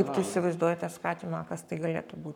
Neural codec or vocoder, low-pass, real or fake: codec, 44.1 kHz, 7.8 kbps, Pupu-Codec; 19.8 kHz; fake